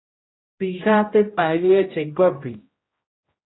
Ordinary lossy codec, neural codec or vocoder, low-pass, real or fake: AAC, 16 kbps; codec, 16 kHz, 0.5 kbps, X-Codec, HuBERT features, trained on general audio; 7.2 kHz; fake